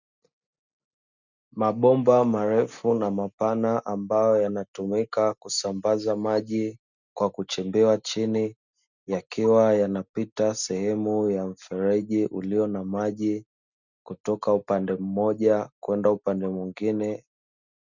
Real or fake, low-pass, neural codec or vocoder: real; 7.2 kHz; none